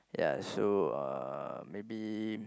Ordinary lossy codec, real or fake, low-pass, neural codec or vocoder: none; real; none; none